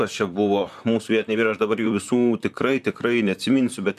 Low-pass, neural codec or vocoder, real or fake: 14.4 kHz; vocoder, 44.1 kHz, 128 mel bands, Pupu-Vocoder; fake